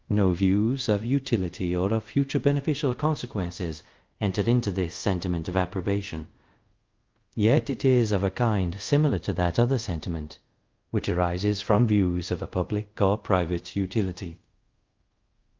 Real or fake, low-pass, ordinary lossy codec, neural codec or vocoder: fake; 7.2 kHz; Opus, 32 kbps; codec, 24 kHz, 0.5 kbps, DualCodec